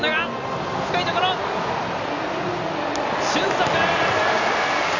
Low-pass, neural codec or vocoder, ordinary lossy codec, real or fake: 7.2 kHz; none; none; real